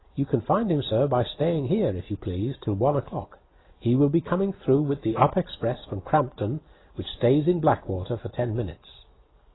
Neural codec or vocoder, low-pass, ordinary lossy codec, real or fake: none; 7.2 kHz; AAC, 16 kbps; real